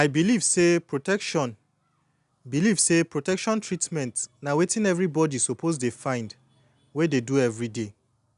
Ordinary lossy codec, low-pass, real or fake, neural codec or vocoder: none; 10.8 kHz; real; none